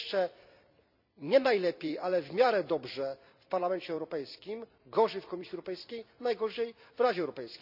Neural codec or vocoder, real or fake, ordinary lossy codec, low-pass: vocoder, 44.1 kHz, 128 mel bands every 256 samples, BigVGAN v2; fake; none; 5.4 kHz